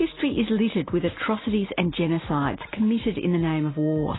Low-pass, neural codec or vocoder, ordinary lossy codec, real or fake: 7.2 kHz; none; AAC, 16 kbps; real